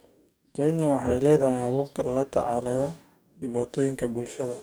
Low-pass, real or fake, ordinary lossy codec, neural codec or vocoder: none; fake; none; codec, 44.1 kHz, 2.6 kbps, DAC